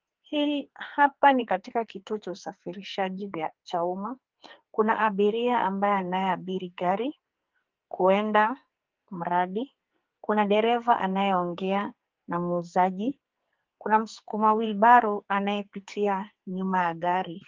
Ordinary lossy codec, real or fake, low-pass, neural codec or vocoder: Opus, 32 kbps; fake; 7.2 kHz; codec, 44.1 kHz, 2.6 kbps, SNAC